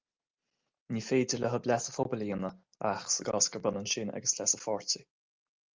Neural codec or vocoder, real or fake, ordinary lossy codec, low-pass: none; real; Opus, 24 kbps; 7.2 kHz